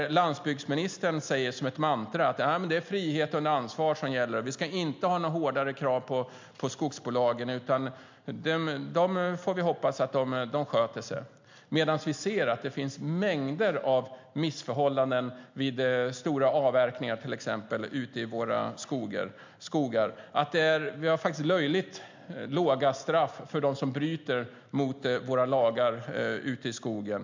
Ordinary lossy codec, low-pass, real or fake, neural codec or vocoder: MP3, 64 kbps; 7.2 kHz; real; none